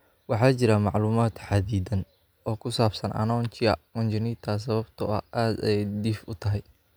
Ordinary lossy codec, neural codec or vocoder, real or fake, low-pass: none; none; real; none